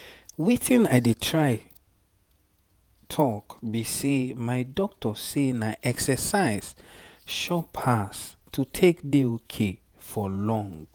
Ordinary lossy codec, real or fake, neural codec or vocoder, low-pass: none; fake; vocoder, 48 kHz, 128 mel bands, Vocos; none